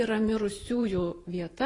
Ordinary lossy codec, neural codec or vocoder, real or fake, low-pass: AAC, 32 kbps; vocoder, 44.1 kHz, 128 mel bands every 512 samples, BigVGAN v2; fake; 10.8 kHz